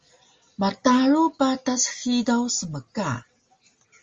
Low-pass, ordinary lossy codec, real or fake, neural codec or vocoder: 7.2 kHz; Opus, 32 kbps; real; none